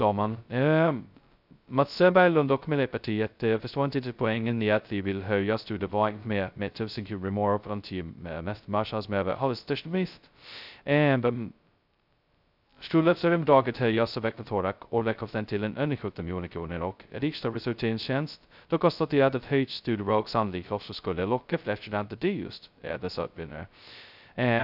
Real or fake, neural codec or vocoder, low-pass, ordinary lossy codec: fake; codec, 16 kHz, 0.2 kbps, FocalCodec; 5.4 kHz; none